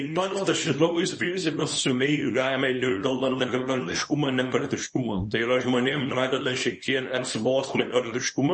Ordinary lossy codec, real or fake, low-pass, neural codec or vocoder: MP3, 32 kbps; fake; 10.8 kHz; codec, 24 kHz, 0.9 kbps, WavTokenizer, small release